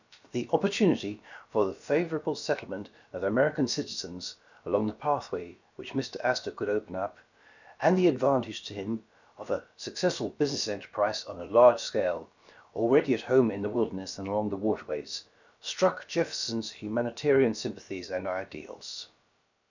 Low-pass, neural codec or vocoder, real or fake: 7.2 kHz; codec, 16 kHz, about 1 kbps, DyCAST, with the encoder's durations; fake